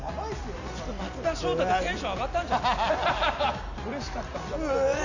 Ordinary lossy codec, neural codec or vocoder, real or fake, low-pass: none; none; real; 7.2 kHz